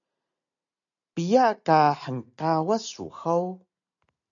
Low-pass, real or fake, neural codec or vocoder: 7.2 kHz; real; none